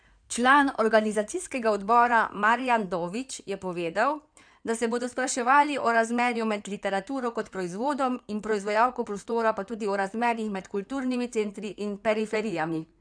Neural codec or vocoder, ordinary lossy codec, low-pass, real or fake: codec, 16 kHz in and 24 kHz out, 2.2 kbps, FireRedTTS-2 codec; none; 9.9 kHz; fake